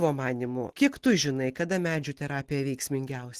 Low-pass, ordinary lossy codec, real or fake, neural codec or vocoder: 14.4 kHz; Opus, 16 kbps; real; none